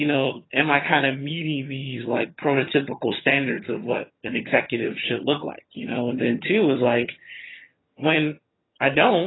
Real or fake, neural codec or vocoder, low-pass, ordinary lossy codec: fake; vocoder, 22.05 kHz, 80 mel bands, HiFi-GAN; 7.2 kHz; AAC, 16 kbps